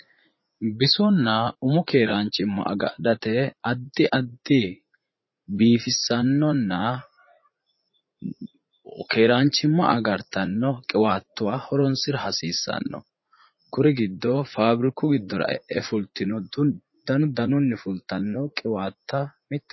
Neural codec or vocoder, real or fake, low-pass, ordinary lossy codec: vocoder, 44.1 kHz, 80 mel bands, Vocos; fake; 7.2 kHz; MP3, 24 kbps